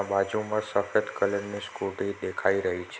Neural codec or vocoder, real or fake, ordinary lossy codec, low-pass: none; real; none; none